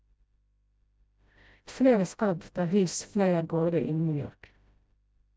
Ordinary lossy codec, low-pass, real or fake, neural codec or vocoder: none; none; fake; codec, 16 kHz, 0.5 kbps, FreqCodec, smaller model